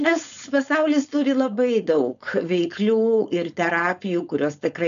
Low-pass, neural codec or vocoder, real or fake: 7.2 kHz; codec, 16 kHz, 4.8 kbps, FACodec; fake